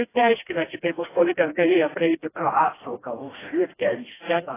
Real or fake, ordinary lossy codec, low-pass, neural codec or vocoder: fake; AAC, 16 kbps; 3.6 kHz; codec, 16 kHz, 1 kbps, FreqCodec, smaller model